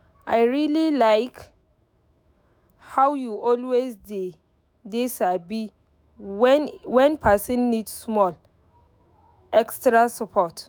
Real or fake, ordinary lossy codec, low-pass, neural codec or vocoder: fake; none; none; autoencoder, 48 kHz, 128 numbers a frame, DAC-VAE, trained on Japanese speech